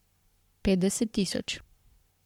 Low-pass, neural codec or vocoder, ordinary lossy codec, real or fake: 19.8 kHz; codec, 44.1 kHz, 7.8 kbps, Pupu-Codec; MP3, 96 kbps; fake